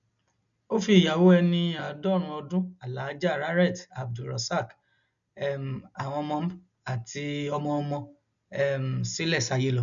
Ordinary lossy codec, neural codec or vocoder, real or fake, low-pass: none; none; real; 7.2 kHz